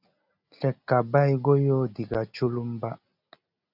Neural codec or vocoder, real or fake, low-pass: none; real; 5.4 kHz